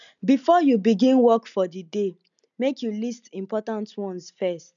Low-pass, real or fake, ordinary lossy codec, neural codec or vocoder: 7.2 kHz; real; none; none